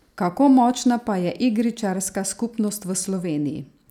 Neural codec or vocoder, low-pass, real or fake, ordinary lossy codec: none; 19.8 kHz; real; none